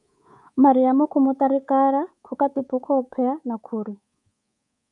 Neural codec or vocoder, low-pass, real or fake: codec, 24 kHz, 3.1 kbps, DualCodec; 10.8 kHz; fake